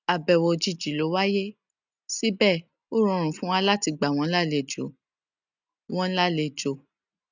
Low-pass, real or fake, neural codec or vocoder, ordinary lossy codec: 7.2 kHz; real; none; none